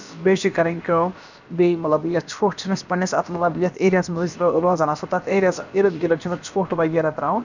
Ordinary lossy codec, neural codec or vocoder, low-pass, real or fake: none; codec, 16 kHz, about 1 kbps, DyCAST, with the encoder's durations; 7.2 kHz; fake